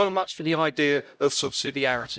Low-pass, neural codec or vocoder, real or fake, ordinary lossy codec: none; codec, 16 kHz, 0.5 kbps, X-Codec, HuBERT features, trained on LibriSpeech; fake; none